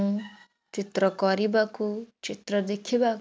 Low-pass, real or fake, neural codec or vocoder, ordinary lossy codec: none; real; none; none